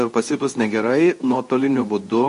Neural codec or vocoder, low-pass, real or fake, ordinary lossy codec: codec, 24 kHz, 0.9 kbps, WavTokenizer, medium speech release version 2; 10.8 kHz; fake; MP3, 48 kbps